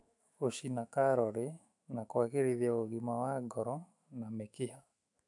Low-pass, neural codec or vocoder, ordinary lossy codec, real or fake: 10.8 kHz; autoencoder, 48 kHz, 128 numbers a frame, DAC-VAE, trained on Japanese speech; none; fake